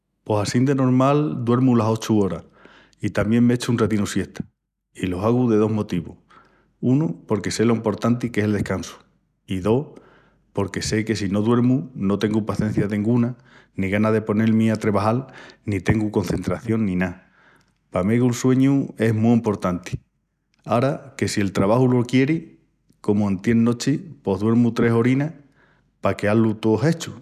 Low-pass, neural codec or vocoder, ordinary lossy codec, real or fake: 14.4 kHz; none; none; real